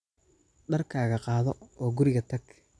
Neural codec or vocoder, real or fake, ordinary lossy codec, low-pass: none; real; none; none